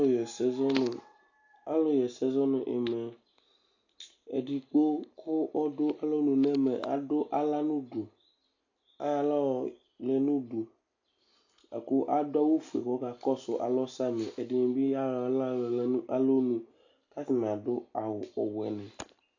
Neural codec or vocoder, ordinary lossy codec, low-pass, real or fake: none; AAC, 48 kbps; 7.2 kHz; real